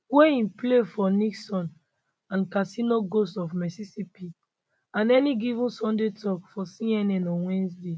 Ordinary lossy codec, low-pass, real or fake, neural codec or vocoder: none; none; real; none